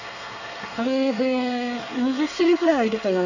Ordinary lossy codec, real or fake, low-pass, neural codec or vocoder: none; fake; 7.2 kHz; codec, 24 kHz, 1 kbps, SNAC